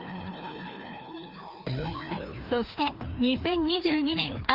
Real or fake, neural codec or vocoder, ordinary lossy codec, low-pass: fake; codec, 16 kHz, 2 kbps, FreqCodec, larger model; Opus, 32 kbps; 5.4 kHz